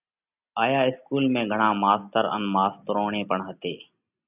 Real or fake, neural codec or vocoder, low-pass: real; none; 3.6 kHz